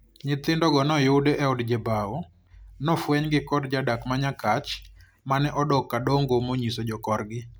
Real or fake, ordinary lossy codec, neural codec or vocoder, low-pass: real; none; none; none